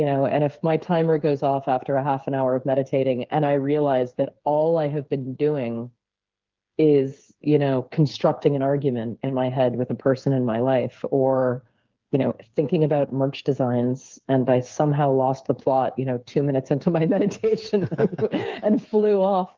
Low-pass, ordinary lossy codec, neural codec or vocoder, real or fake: 7.2 kHz; Opus, 32 kbps; codec, 16 kHz, 8 kbps, FreqCodec, smaller model; fake